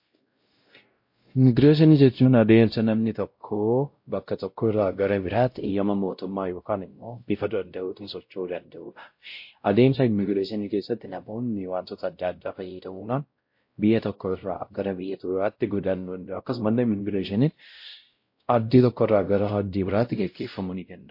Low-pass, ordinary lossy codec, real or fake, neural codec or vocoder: 5.4 kHz; MP3, 32 kbps; fake; codec, 16 kHz, 0.5 kbps, X-Codec, WavLM features, trained on Multilingual LibriSpeech